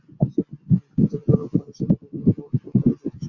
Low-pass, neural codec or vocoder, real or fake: 7.2 kHz; none; real